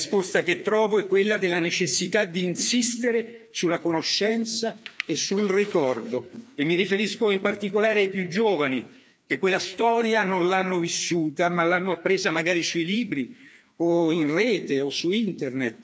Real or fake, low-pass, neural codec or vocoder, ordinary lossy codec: fake; none; codec, 16 kHz, 2 kbps, FreqCodec, larger model; none